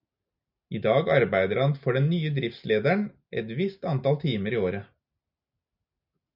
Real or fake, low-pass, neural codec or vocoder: real; 5.4 kHz; none